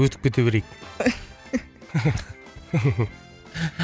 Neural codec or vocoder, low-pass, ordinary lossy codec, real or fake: none; none; none; real